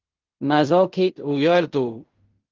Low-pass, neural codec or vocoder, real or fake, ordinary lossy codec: 7.2 kHz; codec, 16 kHz in and 24 kHz out, 0.4 kbps, LongCat-Audio-Codec, fine tuned four codebook decoder; fake; Opus, 32 kbps